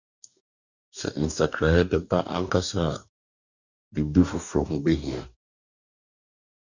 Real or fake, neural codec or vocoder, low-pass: fake; codec, 44.1 kHz, 2.6 kbps, DAC; 7.2 kHz